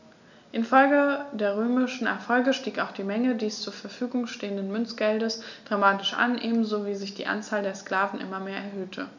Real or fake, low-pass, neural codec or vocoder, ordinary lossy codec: real; 7.2 kHz; none; none